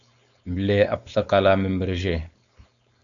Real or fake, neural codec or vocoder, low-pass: fake; codec, 16 kHz, 4.8 kbps, FACodec; 7.2 kHz